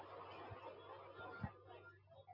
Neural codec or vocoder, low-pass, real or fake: none; 5.4 kHz; real